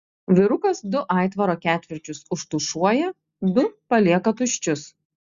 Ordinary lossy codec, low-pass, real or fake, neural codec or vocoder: Opus, 64 kbps; 7.2 kHz; real; none